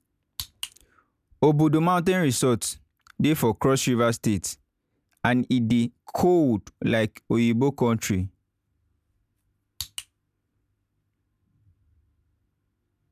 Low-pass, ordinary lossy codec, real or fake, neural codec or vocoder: 14.4 kHz; none; real; none